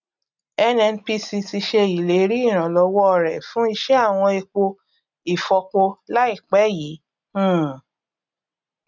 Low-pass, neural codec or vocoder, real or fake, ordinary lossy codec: 7.2 kHz; none; real; none